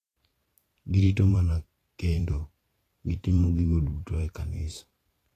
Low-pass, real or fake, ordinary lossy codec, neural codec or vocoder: 14.4 kHz; fake; AAC, 48 kbps; vocoder, 44.1 kHz, 128 mel bands every 512 samples, BigVGAN v2